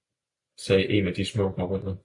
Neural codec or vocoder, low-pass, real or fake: none; 10.8 kHz; real